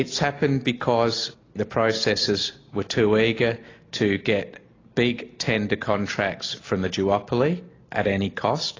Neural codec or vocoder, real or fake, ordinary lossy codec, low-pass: none; real; AAC, 32 kbps; 7.2 kHz